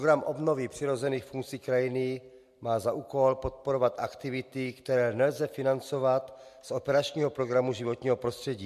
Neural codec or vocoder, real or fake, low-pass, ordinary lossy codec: none; real; 14.4 kHz; MP3, 64 kbps